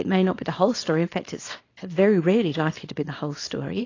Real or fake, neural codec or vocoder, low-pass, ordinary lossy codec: fake; codec, 24 kHz, 0.9 kbps, WavTokenizer, small release; 7.2 kHz; AAC, 32 kbps